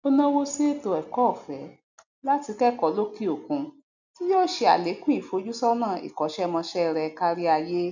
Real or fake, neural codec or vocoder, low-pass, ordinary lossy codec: real; none; 7.2 kHz; none